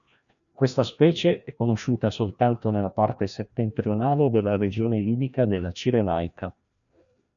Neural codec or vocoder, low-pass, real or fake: codec, 16 kHz, 1 kbps, FreqCodec, larger model; 7.2 kHz; fake